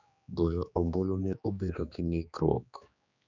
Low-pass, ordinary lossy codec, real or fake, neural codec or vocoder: 7.2 kHz; none; fake; codec, 16 kHz, 2 kbps, X-Codec, HuBERT features, trained on general audio